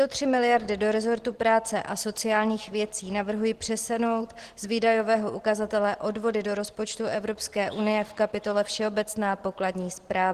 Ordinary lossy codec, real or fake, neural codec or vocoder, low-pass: Opus, 16 kbps; real; none; 14.4 kHz